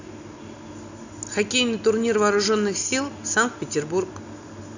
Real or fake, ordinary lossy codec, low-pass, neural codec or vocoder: real; none; 7.2 kHz; none